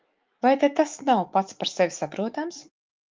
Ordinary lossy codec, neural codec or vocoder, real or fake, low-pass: Opus, 24 kbps; autoencoder, 48 kHz, 128 numbers a frame, DAC-VAE, trained on Japanese speech; fake; 7.2 kHz